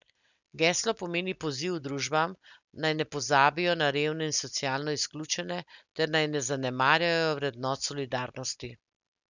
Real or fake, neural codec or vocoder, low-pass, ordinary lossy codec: real; none; 7.2 kHz; none